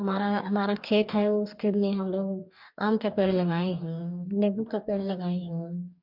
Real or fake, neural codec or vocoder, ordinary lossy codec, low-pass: fake; codec, 44.1 kHz, 2.6 kbps, DAC; MP3, 48 kbps; 5.4 kHz